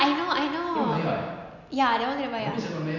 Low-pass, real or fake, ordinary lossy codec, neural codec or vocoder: 7.2 kHz; real; none; none